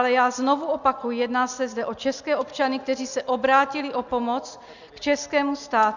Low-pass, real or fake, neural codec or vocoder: 7.2 kHz; real; none